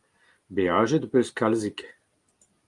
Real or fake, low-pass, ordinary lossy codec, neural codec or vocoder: real; 10.8 kHz; Opus, 32 kbps; none